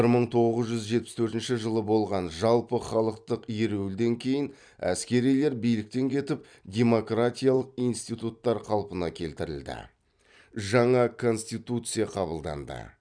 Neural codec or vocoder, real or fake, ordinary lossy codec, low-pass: vocoder, 44.1 kHz, 128 mel bands every 256 samples, BigVGAN v2; fake; none; 9.9 kHz